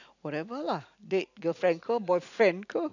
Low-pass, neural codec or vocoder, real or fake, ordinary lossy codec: 7.2 kHz; none; real; none